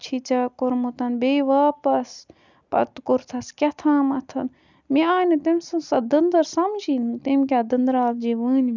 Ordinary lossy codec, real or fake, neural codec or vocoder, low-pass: none; real; none; 7.2 kHz